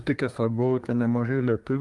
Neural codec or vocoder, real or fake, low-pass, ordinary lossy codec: codec, 24 kHz, 1 kbps, SNAC; fake; 10.8 kHz; Opus, 32 kbps